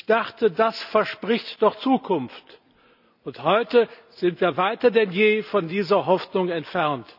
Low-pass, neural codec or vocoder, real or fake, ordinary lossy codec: 5.4 kHz; none; real; none